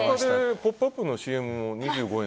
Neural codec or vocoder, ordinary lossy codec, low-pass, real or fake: none; none; none; real